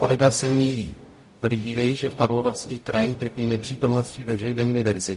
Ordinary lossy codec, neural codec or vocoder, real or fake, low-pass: MP3, 48 kbps; codec, 44.1 kHz, 0.9 kbps, DAC; fake; 14.4 kHz